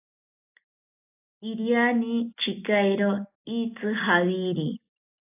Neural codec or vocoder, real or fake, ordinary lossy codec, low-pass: none; real; AAC, 32 kbps; 3.6 kHz